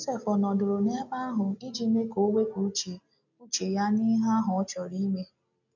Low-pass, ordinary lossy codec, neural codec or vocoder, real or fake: 7.2 kHz; none; none; real